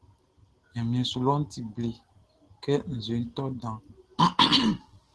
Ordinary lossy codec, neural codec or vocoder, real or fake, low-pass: Opus, 16 kbps; none; real; 10.8 kHz